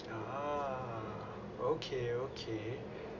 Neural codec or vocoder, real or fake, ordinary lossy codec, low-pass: none; real; none; 7.2 kHz